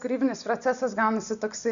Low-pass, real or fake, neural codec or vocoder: 7.2 kHz; real; none